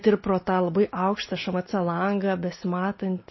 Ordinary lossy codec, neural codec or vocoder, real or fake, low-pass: MP3, 24 kbps; none; real; 7.2 kHz